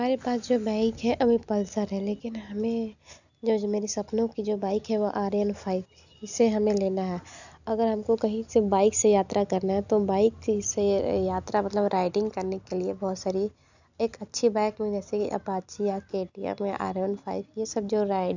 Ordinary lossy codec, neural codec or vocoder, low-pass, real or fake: none; none; 7.2 kHz; real